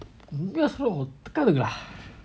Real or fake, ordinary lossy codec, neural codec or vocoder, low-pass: real; none; none; none